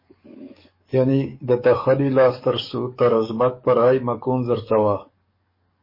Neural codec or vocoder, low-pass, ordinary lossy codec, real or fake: codec, 44.1 kHz, 7.8 kbps, DAC; 5.4 kHz; MP3, 24 kbps; fake